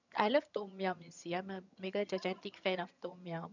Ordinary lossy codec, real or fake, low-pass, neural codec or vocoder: none; fake; 7.2 kHz; vocoder, 22.05 kHz, 80 mel bands, HiFi-GAN